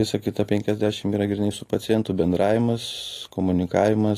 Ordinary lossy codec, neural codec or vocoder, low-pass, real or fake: AAC, 48 kbps; none; 14.4 kHz; real